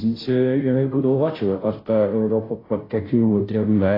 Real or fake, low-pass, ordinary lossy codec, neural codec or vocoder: fake; 5.4 kHz; AAC, 24 kbps; codec, 16 kHz, 0.5 kbps, FunCodec, trained on Chinese and English, 25 frames a second